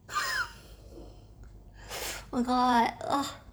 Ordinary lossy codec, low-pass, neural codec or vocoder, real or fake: none; none; vocoder, 44.1 kHz, 128 mel bands every 512 samples, BigVGAN v2; fake